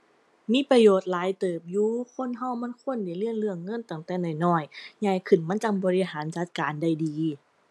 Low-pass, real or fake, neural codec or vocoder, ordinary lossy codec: none; real; none; none